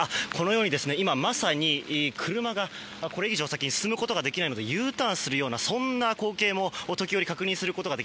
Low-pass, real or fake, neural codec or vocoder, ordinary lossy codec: none; real; none; none